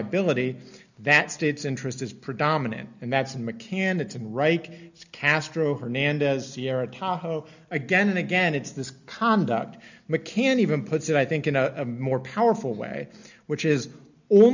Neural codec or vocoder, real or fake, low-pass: none; real; 7.2 kHz